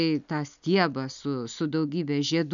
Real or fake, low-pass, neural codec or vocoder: real; 7.2 kHz; none